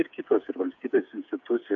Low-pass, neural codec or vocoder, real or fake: 7.2 kHz; codec, 16 kHz, 8 kbps, FreqCodec, smaller model; fake